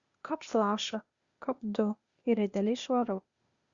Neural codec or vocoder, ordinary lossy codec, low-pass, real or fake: codec, 16 kHz, 0.8 kbps, ZipCodec; Opus, 64 kbps; 7.2 kHz; fake